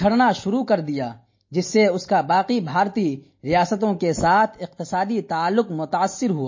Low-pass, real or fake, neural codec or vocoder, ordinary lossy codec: 7.2 kHz; real; none; MP3, 32 kbps